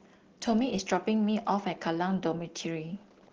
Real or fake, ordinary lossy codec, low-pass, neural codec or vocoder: real; Opus, 16 kbps; 7.2 kHz; none